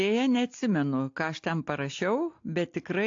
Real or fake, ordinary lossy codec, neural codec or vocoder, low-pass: real; AAC, 48 kbps; none; 7.2 kHz